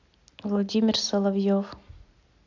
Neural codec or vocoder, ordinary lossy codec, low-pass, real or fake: none; AAC, 48 kbps; 7.2 kHz; real